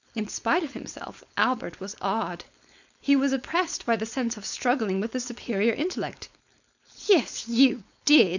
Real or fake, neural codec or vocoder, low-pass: fake; codec, 16 kHz, 4.8 kbps, FACodec; 7.2 kHz